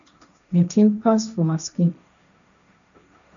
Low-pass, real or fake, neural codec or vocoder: 7.2 kHz; fake; codec, 16 kHz, 1.1 kbps, Voila-Tokenizer